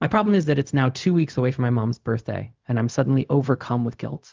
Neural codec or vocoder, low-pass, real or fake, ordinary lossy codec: codec, 16 kHz, 0.4 kbps, LongCat-Audio-Codec; 7.2 kHz; fake; Opus, 24 kbps